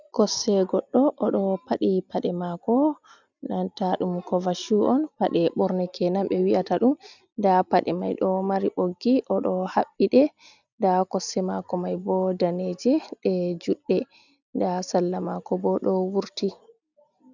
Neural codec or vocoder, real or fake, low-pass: none; real; 7.2 kHz